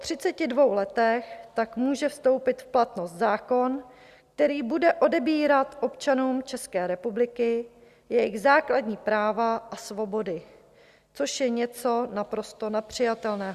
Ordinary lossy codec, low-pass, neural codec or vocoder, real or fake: Opus, 64 kbps; 14.4 kHz; none; real